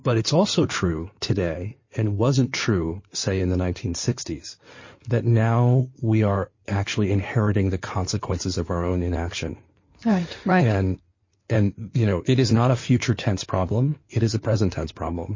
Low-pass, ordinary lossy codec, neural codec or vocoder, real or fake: 7.2 kHz; MP3, 32 kbps; codec, 16 kHz in and 24 kHz out, 2.2 kbps, FireRedTTS-2 codec; fake